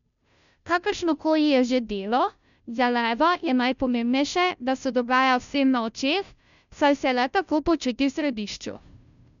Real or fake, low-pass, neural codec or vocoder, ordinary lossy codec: fake; 7.2 kHz; codec, 16 kHz, 0.5 kbps, FunCodec, trained on Chinese and English, 25 frames a second; none